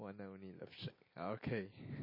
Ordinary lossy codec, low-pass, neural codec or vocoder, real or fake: MP3, 24 kbps; 5.4 kHz; none; real